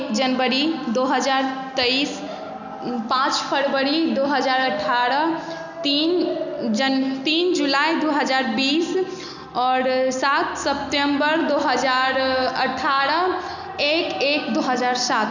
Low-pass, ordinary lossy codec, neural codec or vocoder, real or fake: 7.2 kHz; none; none; real